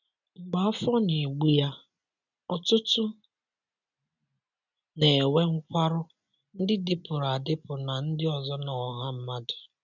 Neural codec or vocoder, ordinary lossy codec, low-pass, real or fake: none; none; 7.2 kHz; real